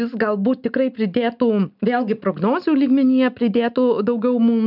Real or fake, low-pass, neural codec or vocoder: real; 5.4 kHz; none